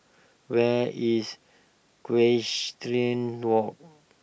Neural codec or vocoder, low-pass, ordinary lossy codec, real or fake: none; none; none; real